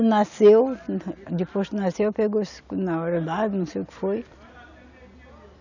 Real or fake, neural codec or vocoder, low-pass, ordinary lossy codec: real; none; 7.2 kHz; none